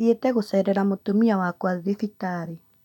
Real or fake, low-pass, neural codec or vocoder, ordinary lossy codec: real; 19.8 kHz; none; none